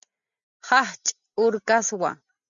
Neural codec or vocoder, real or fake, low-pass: none; real; 7.2 kHz